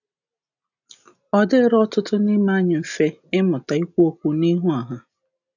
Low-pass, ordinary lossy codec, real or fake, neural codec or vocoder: 7.2 kHz; none; real; none